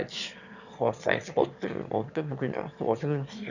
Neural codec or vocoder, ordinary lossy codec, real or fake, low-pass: autoencoder, 22.05 kHz, a latent of 192 numbers a frame, VITS, trained on one speaker; none; fake; 7.2 kHz